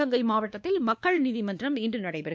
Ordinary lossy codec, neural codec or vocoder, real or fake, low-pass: none; codec, 16 kHz, 2 kbps, X-Codec, WavLM features, trained on Multilingual LibriSpeech; fake; none